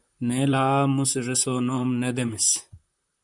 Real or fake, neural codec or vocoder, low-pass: fake; vocoder, 44.1 kHz, 128 mel bands, Pupu-Vocoder; 10.8 kHz